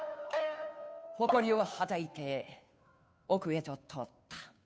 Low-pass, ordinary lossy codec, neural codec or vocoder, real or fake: none; none; codec, 16 kHz, 2 kbps, FunCodec, trained on Chinese and English, 25 frames a second; fake